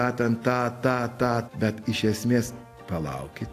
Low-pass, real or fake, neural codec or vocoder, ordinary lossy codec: 14.4 kHz; real; none; AAC, 64 kbps